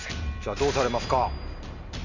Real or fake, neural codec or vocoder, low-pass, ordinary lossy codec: real; none; 7.2 kHz; Opus, 64 kbps